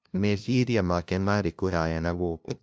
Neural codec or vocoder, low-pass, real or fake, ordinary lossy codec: codec, 16 kHz, 0.5 kbps, FunCodec, trained on LibriTTS, 25 frames a second; none; fake; none